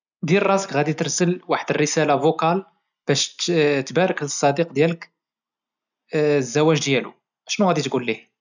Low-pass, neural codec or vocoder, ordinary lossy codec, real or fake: 7.2 kHz; none; none; real